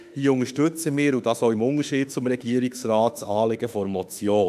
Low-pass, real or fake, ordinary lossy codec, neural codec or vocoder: 14.4 kHz; fake; none; autoencoder, 48 kHz, 32 numbers a frame, DAC-VAE, trained on Japanese speech